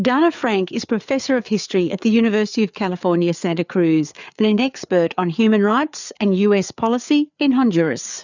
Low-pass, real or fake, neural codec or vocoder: 7.2 kHz; fake; codec, 16 kHz, 4 kbps, FreqCodec, larger model